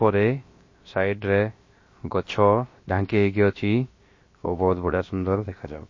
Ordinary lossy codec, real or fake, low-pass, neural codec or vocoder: MP3, 32 kbps; fake; 7.2 kHz; codec, 16 kHz, about 1 kbps, DyCAST, with the encoder's durations